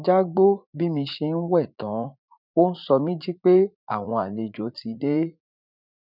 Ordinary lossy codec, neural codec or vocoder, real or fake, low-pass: none; vocoder, 44.1 kHz, 80 mel bands, Vocos; fake; 5.4 kHz